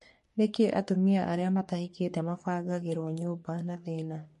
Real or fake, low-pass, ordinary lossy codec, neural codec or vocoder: fake; 14.4 kHz; MP3, 48 kbps; codec, 44.1 kHz, 3.4 kbps, Pupu-Codec